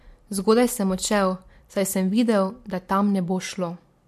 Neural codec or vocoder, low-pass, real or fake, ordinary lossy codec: none; 14.4 kHz; real; MP3, 64 kbps